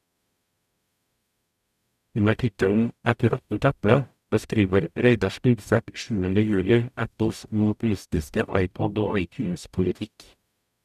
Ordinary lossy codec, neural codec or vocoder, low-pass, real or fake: none; codec, 44.1 kHz, 0.9 kbps, DAC; 14.4 kHz; fake